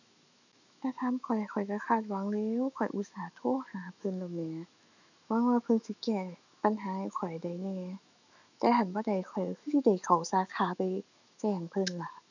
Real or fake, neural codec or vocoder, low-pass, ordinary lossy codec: fake; autoencoder, 48 kHz, 128 numbers a frame, DAC-VAE, trained on Japanese speech; 7.2 kHz; none